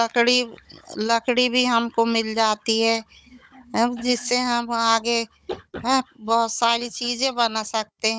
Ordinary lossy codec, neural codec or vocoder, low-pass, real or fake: none; codec, 16 kHz, 16 kbps, FunCodec, trained on Chinese and English, 50 frames a second; none; fake